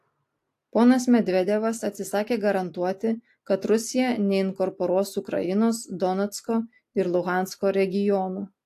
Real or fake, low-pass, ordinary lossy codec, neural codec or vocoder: real; 14.4 kHz; AAC, 64 kbps; none